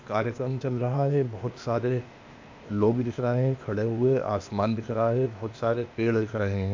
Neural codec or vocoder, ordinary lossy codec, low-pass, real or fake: codec, 16 kHz, 0.8 kbps, ZipCodec; MP3, 64 kbps; 7.2 kHz; fake